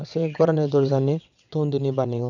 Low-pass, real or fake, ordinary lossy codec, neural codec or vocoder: 7.2 kHz; fake; none; vocoder, 22.05 kHz, 80 mel bands, WaveNeXt